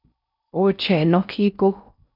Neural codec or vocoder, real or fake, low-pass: codec, 16 kHz in and 24 kHz out, 0.6 kbps, FocalCodec, streaming, 4096 codes; fake; 5.4 kHz